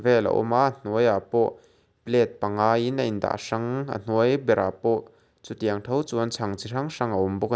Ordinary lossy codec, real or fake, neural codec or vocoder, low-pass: none; real; none; none